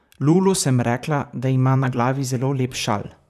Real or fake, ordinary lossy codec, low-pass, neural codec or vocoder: fake; none; 14.4 kHz; vocoder, 44.1 kHz, 128 mel bands, Pupu-Vocoder